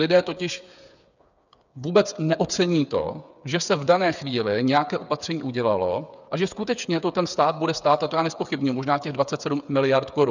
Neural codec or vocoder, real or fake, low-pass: codec, 16 kHz, 8 kbps, FreqCodec, smaller model; fake; 7.2 kHz